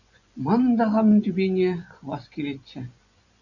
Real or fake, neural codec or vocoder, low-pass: fake; vocoder, 24 kHz, 100 mel bands, Vocos; 7.2 kHz